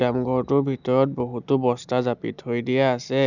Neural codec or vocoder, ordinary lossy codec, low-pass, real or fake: none; none; 7.2 kHz; real